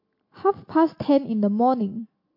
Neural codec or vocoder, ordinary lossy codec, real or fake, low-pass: none; MP3, 32 kbps; real; 5.4 kHz